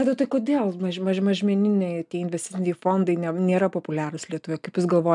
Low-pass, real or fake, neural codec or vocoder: 10.8 kHz; real; none